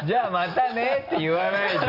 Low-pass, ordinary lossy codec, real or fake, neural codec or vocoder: 5.4 kHz; Opus, 64 kbps; real; none